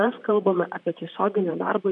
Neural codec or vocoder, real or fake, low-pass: vocoder, 44.1 kHz, 128 mel bands, Pupu-Vocoder; fake; 10.8 kHz